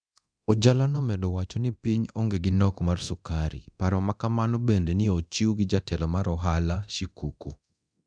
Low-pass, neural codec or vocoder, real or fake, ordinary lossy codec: 9.9 kHz; codec, 24 kHz, 0.9 kbps, DualCodec; fake; none